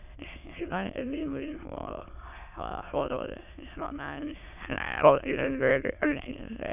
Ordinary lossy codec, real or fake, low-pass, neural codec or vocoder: none; fake; 3.6 kHz; autoencoder, 22.05 kHz, a latent of 192 numbers a frame, VITS, trained on many speakers